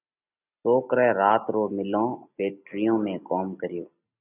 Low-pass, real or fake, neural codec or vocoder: 3.6 kHz; real; none